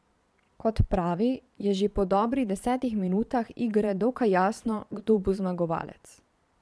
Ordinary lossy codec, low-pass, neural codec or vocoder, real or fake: none; none; vocoder, 22.05 kHz, 80 mel bands, Vocos; fake